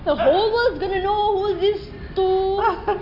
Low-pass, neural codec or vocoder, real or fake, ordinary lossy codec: 5.4 kHz; none; real; none